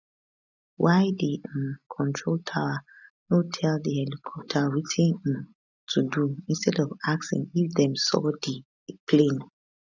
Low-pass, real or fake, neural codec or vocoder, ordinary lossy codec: 7.2 kHz; real; none; none